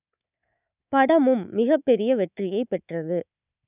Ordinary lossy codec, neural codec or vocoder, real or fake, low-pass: none; codec, 24 kHz, 3.1 kbps, DualCodec; fake; 3.6 kHz